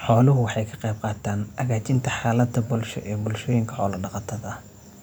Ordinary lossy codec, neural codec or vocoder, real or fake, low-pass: none; none; real; none